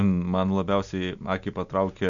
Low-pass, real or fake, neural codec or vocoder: 7.2 kHz; real; none